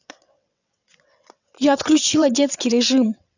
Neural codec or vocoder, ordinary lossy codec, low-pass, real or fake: vocoder, 22.05 kHz, 80 mel bands, WaveNeXt; none; 7.2 kHz; fake